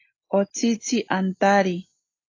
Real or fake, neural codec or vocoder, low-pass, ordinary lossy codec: real; none; 7.2 kHz; AAC, 32 kbps